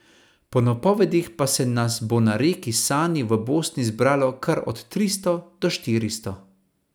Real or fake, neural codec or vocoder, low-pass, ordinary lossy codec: real; none; none; none